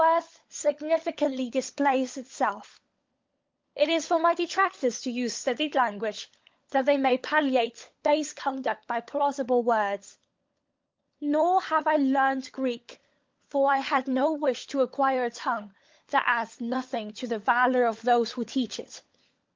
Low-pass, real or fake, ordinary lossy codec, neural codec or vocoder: 7.2 kHz; fake; Opus, 32 kbps; codec, 16 kHz, 16 kbps, FunCodec, trained on LibriTTS, 50 frames a second